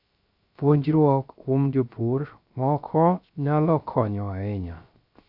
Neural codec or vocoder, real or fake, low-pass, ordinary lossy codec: codec, 16 kHz, 0.3 kbps, FocalCodec; fake; 5.4 kHz; none